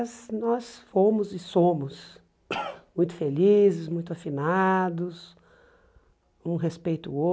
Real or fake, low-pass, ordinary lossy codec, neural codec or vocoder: real; none; none; none